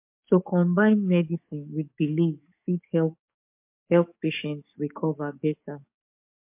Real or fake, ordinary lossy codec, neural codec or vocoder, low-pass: fake; MP3, 32 kbps; codec, 44.1 kHz, 7.8 kbps, DAC; 3.6 kHz